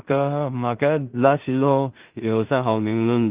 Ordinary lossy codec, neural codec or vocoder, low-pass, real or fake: Opus, 32 kbps; codec, 16 kHz in and 24 kHz out, 0.4 kbps, LongCat-Audio-Codec, two codebook decoder; 3.6 kHz; fake